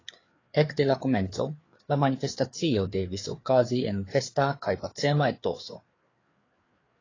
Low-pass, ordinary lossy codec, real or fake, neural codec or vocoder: 7.2 kHz; AAC, 32 kbps; fake; codec, 16 kHz, 4 kbps, FreqCodec, larger model